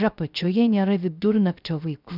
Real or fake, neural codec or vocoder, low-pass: fake; codec, 16 kHz, 0.3 kbps, FocalCodec; 5.4 kHz